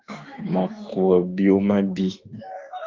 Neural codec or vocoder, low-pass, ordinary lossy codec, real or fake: autoencoder, 48 kHz, 32 numbers a frame, DAC-VAE, trained on Japanese speech; 7.2 kHz; Opus, 24 kbps; fake